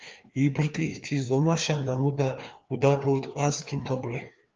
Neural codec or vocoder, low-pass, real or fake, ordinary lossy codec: codec, 16 kHz, 2 kbps, FreqCodec, larger model; 7.2 kHz; fake; Opus, 24 kbps